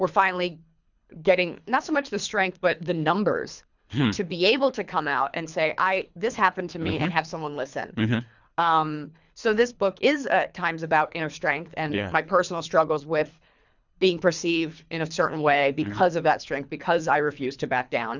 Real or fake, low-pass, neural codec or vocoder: fake; 7.2 kHz; codec, 24 kHz, 3 kbps, HILCodec